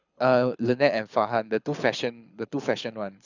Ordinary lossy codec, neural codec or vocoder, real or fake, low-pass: none; codec, 24 kHz, 6 kbps, HILCodec; fake; 7.2 kHz